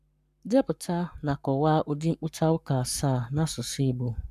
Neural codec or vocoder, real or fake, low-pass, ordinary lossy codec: codec, 44.1 kHz, 7.8 kbps, Pupu-Codec; fake; 14.4 kHz; none